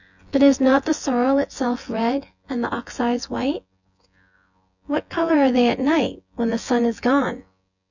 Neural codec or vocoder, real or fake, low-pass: vocoder, 24 kHz, 100 mel bands, Vocos; fake; 7.2 kHz